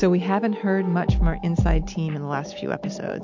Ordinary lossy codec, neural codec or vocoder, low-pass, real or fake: MP3, 48 kbps; none; 7.2 kHz; real